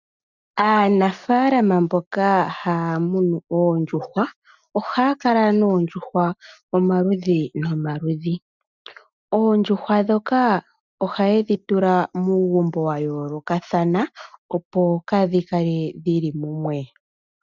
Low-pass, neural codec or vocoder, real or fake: 7.2 kHz; none; real